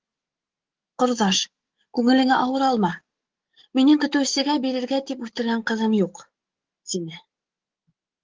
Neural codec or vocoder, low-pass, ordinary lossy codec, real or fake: autoencoder, 48 kHz, 128 numbers a frame, DAC-VAE, trained on Japanese speech; 7.2 kHz; Opus, 16 kbps; fake